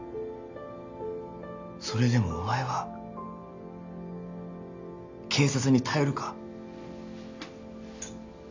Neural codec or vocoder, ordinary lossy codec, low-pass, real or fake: none; MP3, 64 kbps; 7.2 kHz; real